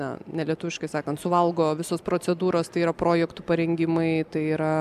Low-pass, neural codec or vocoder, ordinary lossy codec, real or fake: 14.4 kHz; none; MP3, 96 kbps; real